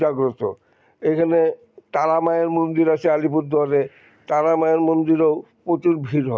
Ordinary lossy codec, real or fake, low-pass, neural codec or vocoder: none; real; none; none